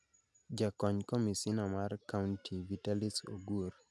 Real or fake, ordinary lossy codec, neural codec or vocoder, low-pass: real; none; none; none